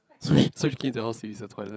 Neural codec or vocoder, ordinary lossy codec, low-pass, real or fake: codec, 16 kHz, 8 kbps, FreqCodec, larger model; none; none; fake